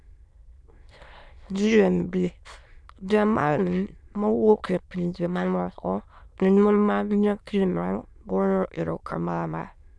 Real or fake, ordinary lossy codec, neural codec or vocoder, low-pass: fake; none; autoencoder, 22.05 kHz, a latent of 192 numbers a frame, VITS, trained on many speakers; none